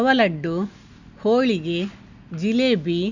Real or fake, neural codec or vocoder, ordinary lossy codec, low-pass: real; none; none; 7.2 kHz